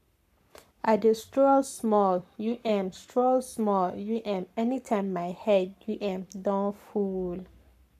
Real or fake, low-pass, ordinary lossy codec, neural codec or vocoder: fake; 14.4 kHz; none; codec, 44.1 kHz, 7.8 kbps, Pupu-Codec